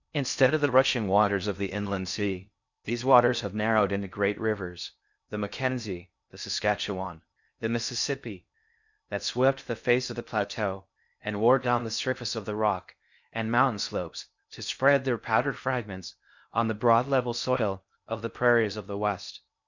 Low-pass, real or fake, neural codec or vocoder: 7.2 kHz; fake; codec, 16 kHz in and 24 kHz out, 0.6 kbps, FocalCodec, streaming, 4096 codes